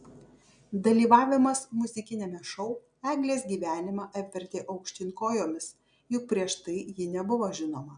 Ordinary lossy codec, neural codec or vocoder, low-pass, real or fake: MP3, 96 kbps; none; 9.9 kHz; real